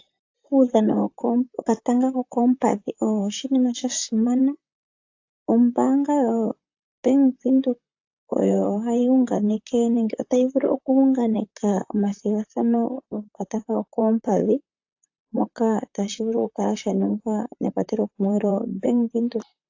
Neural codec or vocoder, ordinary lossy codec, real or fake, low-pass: vocoder, 44.1 kHz, 128 mel bands, Pupu-Vocoder; AAC, 48 kbps; fake; 7.2 kHz